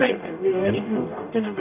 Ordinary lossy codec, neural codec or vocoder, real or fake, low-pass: none; codec, 44.1 kHz, 0.9 kbps, DAC; fake; 3.6 kHz